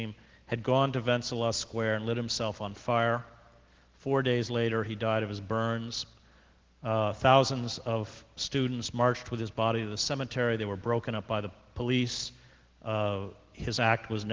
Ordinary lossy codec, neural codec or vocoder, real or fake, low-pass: Opus, 16 kbps; none; real; 7.2 kHz